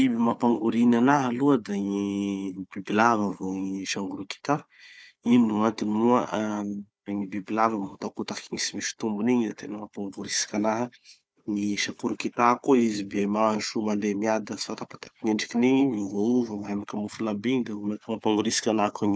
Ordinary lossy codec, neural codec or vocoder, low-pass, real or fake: none; codec, 16 kHz, 4 kbps, FunCodec, trained on Chinese and English, 50 frames a second; none; fake